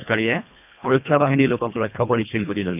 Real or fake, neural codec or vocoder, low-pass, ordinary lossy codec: fake; codec, 24 kHz, 1.5 kbps, HILCodec; 3.6 kHz; none